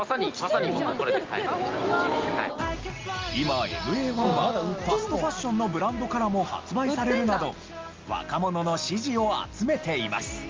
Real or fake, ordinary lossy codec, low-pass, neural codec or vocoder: real; Opus, 32 kbps; 7.2 kHz; none